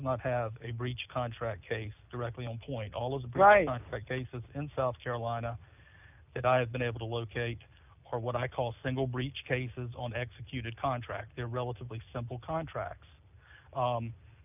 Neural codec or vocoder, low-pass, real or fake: none; 3.6 kHz; real